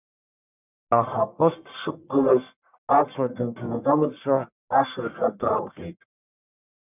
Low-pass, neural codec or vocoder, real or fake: 3.6 kHz; codec, 44.1 kHz, 1.7 kbps, Pupu-Codec; fake